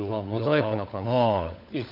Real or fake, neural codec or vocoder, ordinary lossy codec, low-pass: fake; codec, 16 kHz, 16 kbps, FunCodec, trained on LibriTTS, 50 frames a second; none; 5.4 kHz